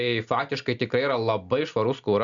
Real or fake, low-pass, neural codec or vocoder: real; 7.2 kHz; none